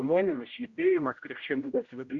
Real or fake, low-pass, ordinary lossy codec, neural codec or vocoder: fake; 7.2 kHz; Opus, 64 kbps; codec, 16 kHz, 0.5 kbps, X-Codec, HuBERT features, trained on general audio